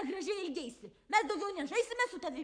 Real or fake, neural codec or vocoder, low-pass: fake; codec, 44.1 kHz, 7.8 kbps, Pupu-Codec; 9.9 kHz